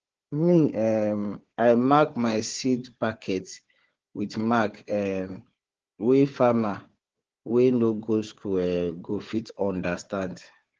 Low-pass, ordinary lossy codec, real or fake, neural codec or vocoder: 7.2 kHz; Opus, 16 kbps; fake; codec, 16 kHz, 4 kbps, FunCodec, trained on Chinese and English, 50 frames a second